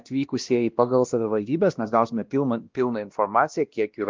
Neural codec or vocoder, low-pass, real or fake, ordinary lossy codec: codec, 16 kHz, 1 kbps, X-Codec, WavLM features, trained on Multilingual LibriSpeech; 7.2 kHz; fake; Opus, 24 kbps